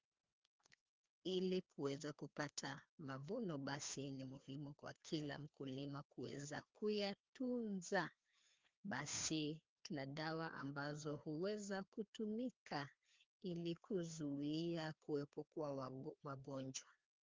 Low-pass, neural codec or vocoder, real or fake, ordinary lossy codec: 7.2 kHz; codec, 16 kHz, 2 kbps, FreqCodec, larger model; fake; Opus, 24 kbps